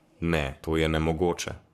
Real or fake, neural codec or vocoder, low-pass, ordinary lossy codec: fake; codec, 44.1 kHz, 7.8 kbps, Pupu-Codec; 14.4 kHz; none